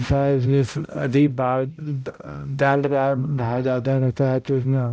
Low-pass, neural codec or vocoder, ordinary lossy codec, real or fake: none; codec, 16 kHz, 0.5 kbps, X-Codec, HuBERT features, trained on balanced general audio; none; fake